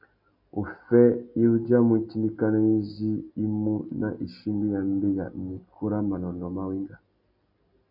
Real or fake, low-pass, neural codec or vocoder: real; 5.4 kHz; none